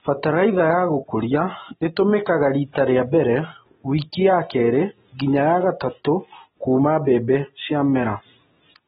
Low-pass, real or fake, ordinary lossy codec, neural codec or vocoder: 9.9 kHz; real; AAC, 16 kbps; none